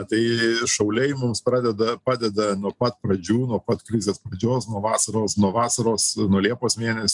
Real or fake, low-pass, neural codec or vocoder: real; 10.8 kHz; none